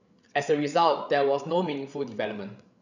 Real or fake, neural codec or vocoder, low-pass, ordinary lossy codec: fake; codec, 16 kHz, 8 kbps, FreqCodec, larger model; 7.2 kHz; none